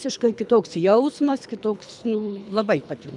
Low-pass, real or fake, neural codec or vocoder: 10.8 kHz; fake; codec, 24 kHz, 3 kbps, HILCodec